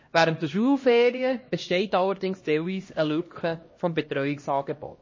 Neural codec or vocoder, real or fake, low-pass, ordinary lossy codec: codec, 16 kHz, 1 kbps, X-Codec, HuBERT features, trained on LibriSpeech; fake; 7.2 kHz; MP3, 32 kbps